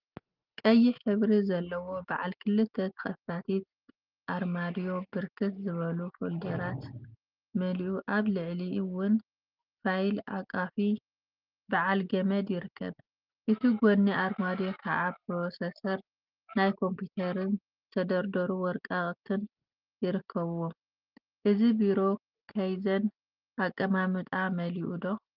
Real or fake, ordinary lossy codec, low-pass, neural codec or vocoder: real; Opus, 32 kbps; 5.4 kHz; none